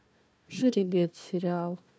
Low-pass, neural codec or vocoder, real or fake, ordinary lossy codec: none; codec, 16 kHz, 1 kbps, FunCodec, trained on Chinese and English, 50 frames a second; fake; none